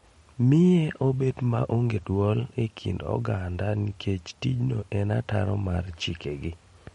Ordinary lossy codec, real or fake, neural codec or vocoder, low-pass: MP3, 48 kbps; real; none; 19.8 kHz